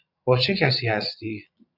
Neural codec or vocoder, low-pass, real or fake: vocoder, 22.05 kHz, 80 mel bands, Vocos; 5.4 kHz; fake